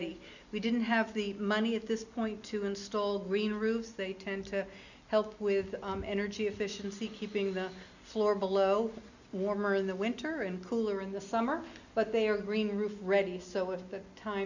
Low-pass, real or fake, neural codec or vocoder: 7.2 kHz; real; none